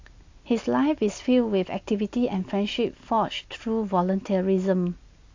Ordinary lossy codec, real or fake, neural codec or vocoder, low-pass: AAC, 48 kbps; real; none; 7.2 kHz